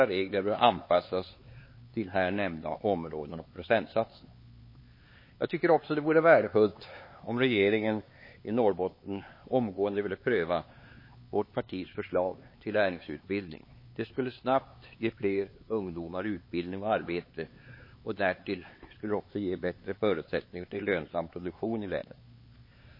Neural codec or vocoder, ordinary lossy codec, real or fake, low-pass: codec, 16 kHz, 4 kbps, X-Codec, HuBERT features, trained on LibriSpeech; MP3, 24 kbps; fake; 5.4 kHz